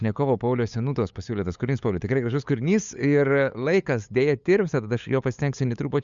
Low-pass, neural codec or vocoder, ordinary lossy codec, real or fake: 7.2 kHz; codec, 16 kHz, 8 kbps, FunCodec, trained on LibriTTS, 25 frames a second; Opus, 64 kbps; fake